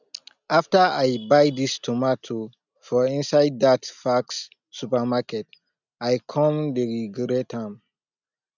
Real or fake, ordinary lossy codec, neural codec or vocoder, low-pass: real; none; none; 7.2 kHz